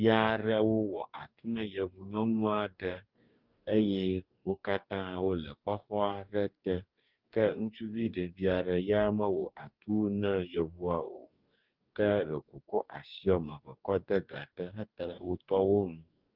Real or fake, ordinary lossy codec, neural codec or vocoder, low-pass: fake; Opus, 24 kbps; codec, 44.1 kHz, 2.6 kbps, DAC; 5.4 kHz